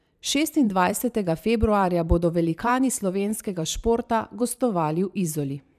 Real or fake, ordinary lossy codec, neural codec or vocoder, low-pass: fake; none; vocoder, 44.1 kHz, 128 mel bands every 512 samples, BigVGAN v2; 14.4 kHz